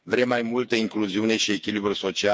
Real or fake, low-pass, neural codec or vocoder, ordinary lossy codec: fake; none; codec, 16 kHz, 4 kbps, FreqCodec, smaller model; none